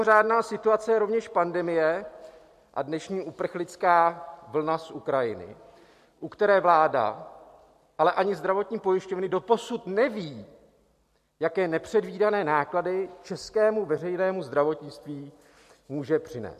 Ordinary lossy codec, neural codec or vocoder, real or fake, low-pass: MP3, 64 kbps; none; real; 14.4 kHz